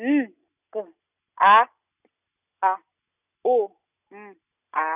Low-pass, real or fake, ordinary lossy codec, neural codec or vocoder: 3.6 kHz; real; none; none